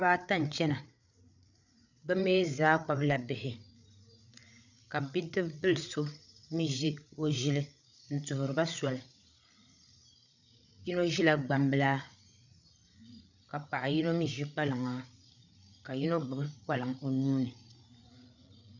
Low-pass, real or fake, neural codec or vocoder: 7.2 kHz; fake; codec, 16 kHz, 8 kbps, FreqCodec, larger model